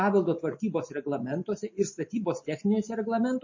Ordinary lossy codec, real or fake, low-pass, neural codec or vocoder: MP3, 32 kbps; real; 7.2 kHz; none